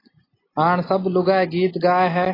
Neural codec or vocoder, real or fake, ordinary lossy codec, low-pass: none; real; AAC, 24 kbps; 5.4 kHz